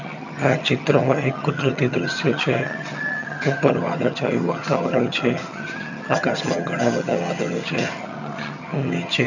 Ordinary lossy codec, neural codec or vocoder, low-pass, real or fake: none; vocoder, 22.05 kHz, 80 mel bands, HiFi-GAN; 7.2 kHz; fake